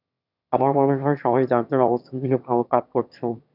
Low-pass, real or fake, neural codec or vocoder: 5.4 kHz; fake; autoencoder, 22.05 kHz, a latent of 192 numbers a frame, VITS, trained on one speaker